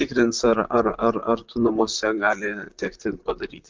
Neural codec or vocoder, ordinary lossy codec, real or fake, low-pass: vocoder, 44.1 kHz, 128 mel bands, Pupu-Vocoder; Opus, 24 kbps; fake; 7.2 kHz